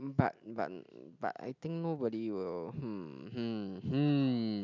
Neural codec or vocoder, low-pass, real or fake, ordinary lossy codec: none; 7.2 kHz; real; none